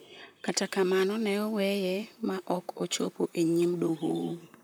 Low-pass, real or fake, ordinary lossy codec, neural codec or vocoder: none; fake; none; vocoder, 44.1 kHz, 128 mel bands, Pupu-Vocoder